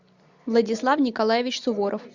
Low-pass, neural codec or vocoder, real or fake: 7.2 kHz; none; real